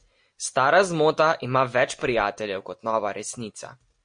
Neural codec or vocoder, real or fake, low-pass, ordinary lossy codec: none; real; 9.9 kHz; MP3, 48 kbps